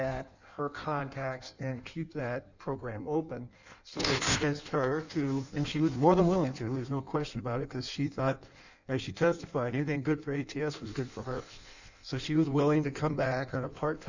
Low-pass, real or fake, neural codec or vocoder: 7.2 kHz; fake; codec, 16 kHz in and 24 kHz out, 1.1 kbps, FireRedTTS-2 codec